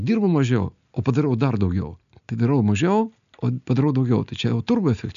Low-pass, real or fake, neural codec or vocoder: 7.2 kHz; real; none